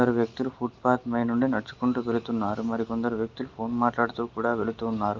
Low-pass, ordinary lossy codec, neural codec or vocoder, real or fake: 7.2 kHz; Opus, 24 kbps; none; real